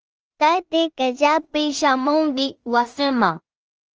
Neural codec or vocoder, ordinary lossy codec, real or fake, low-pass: codec, 16 kHz in and 24 kHz out, 0.4 kbps, LongCat-Audio-Codec, two codebook decoder; Opus, 24 kbps; fake; 7.2 kHz